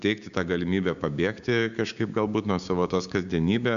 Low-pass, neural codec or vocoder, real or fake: 7.2 kHz; codec, 16 kHz, 6 kbps, DAC; fake